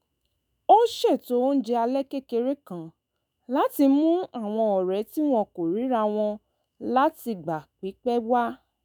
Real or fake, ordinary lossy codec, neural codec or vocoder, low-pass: fake; none; autoencoder, 48 kHz, 128 numbers a frame, DAC-VAE, trained on Japanese speech; none